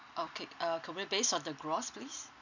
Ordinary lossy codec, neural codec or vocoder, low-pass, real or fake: none; none; 7.2 kHz; real